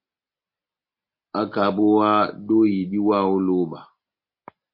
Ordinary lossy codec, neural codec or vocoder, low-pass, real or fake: MP3, 32 kbps; none; 5.4 kHz; real